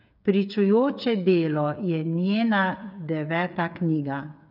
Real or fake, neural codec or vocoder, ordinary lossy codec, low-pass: fake; codec, 16 kHz, 8 kbps, FreqCodec, smaller model; none; 5.4 kHz